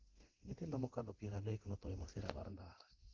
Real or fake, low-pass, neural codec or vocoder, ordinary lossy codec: fake; 7.2 kHz; codec, 24 kHz, 0.9 kbps, DualCodec; Opus, 16 kbps